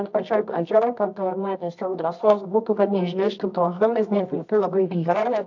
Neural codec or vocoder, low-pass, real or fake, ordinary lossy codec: codec, 24 kHz, 0.9 kbps, WavTokenizer, medium music audio release; 7.2 kHz; fake; AAC, 48 kbps